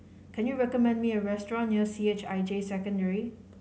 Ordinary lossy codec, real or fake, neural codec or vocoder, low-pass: none; real; none; none